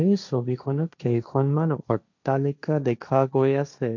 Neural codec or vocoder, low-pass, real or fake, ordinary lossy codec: codec, 16 kHz, 1.1 kbps, Voila-Tokenizer; 7.2 kHz; fake; none